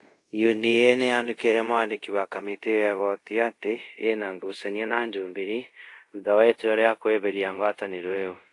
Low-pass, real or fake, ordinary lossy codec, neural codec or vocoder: 10.8 kHz; fake; AAC, 48 kbps; codec, 24 kHz, 0.5 kbps, DualCodec